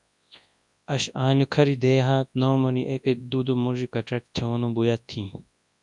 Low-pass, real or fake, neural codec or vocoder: 10.8 kHz; fake; codec, 24 kHz, 0.9 kbps, WavTokenizer, large speech release